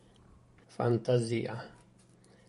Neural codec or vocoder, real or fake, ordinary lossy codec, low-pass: vocoder, 44.1 kHz, 128 mel bands every 512 samples, BigVGAN v2; fake; MP3, 48 kbps; 14.4 kHz